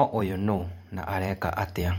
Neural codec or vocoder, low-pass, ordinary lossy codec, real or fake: vocoder, 44.1 kHz, 128 mel bands every 512 samples, BigVGAN v2; 19.8 kHz; MP3, 64 kbps; fake